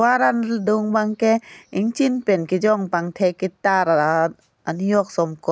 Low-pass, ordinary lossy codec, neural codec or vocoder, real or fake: none; none; none; real